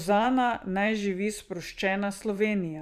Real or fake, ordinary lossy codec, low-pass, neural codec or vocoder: fake; none; 14.4 kHz; vocoder, 44.1 kHz, 128 mel bands every 512 samples, BigVGAN v2